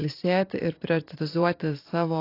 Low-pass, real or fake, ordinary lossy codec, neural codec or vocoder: 5.4 kHz; fake; AAC, 32 kbps; vocoder, 44.1 kHz, 128 mel bands every 512 samples, BigVGAN v2